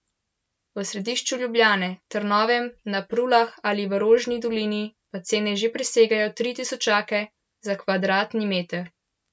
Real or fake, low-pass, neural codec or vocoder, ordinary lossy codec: real; none; none; none